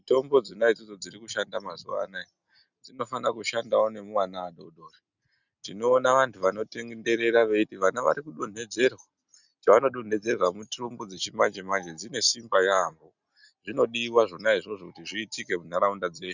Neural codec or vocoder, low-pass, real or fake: none; 7.2 kHz; real